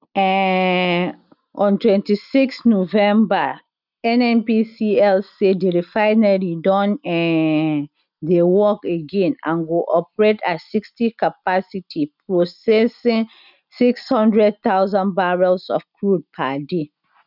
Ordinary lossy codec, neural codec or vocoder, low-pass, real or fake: none; none; 5.4 kHz; real